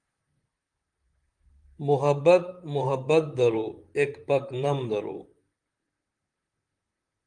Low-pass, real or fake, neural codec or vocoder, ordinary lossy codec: 9.9 kHz; fake; vocoder, 44.1 kHz, 128 mel bands, Pupu-Vocoder; Opus, 32 kbps